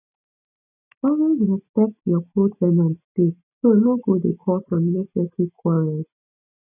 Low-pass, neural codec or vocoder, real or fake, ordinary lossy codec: 3.6 kHz; none; real; none